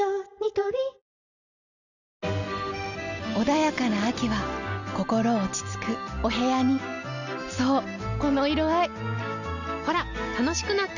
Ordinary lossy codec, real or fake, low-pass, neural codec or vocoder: none; real; 7.2 kHz; none